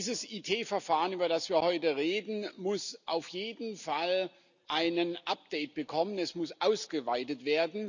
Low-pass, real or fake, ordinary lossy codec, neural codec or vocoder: 7.2 kHz; real; none; none